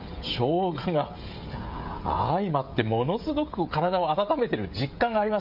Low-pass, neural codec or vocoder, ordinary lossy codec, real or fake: 5.4 kHz; codec, 16 kHz, 16 kbps, FreqCodec, smaller model; MP3, 48 kbps; fake